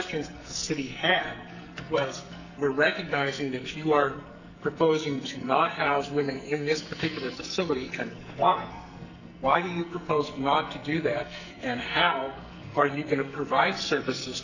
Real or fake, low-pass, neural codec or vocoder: fake; 7.2 kHz; codec, 44.1 kHz, 2.6 kbps, SNAC